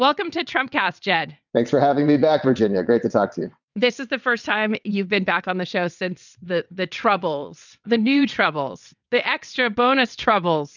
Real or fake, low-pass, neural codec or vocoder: fake; 7.2 kHz; vocoder, 22.05 kHz, 80 mel bands, WaveNeXt